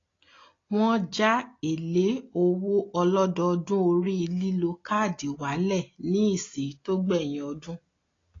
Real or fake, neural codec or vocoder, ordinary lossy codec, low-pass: real; none; AAC, 32 kbps; 7.2 kHz